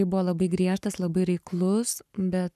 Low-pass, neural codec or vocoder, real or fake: 14.4 kHz; codec, 44.1 kHz, 7.8 kbps, Pupu-Codec; fake